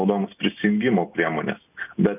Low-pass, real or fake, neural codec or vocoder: 3.6 kHz; real; none